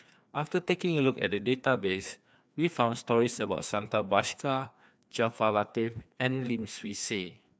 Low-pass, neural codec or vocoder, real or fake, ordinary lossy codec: none; codec, 16 kHz, 2 kbps, FreqCodec, larger model; fake; none